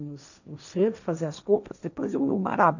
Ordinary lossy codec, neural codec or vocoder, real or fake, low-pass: none; codec, 16 kHz, 1.1 kbps, Voila-Tokenizer; fake; 7.2 kHz